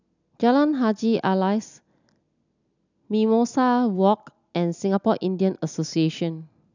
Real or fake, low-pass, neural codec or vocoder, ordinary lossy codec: real; 7.2 kHz; none; none